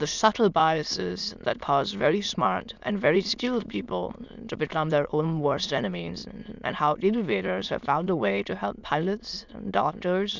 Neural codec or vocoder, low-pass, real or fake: autoencoder, 22.05 kHz, a latent of 192 numbers a frame, VITS, trained on many speakers; 7.2 kHz; fake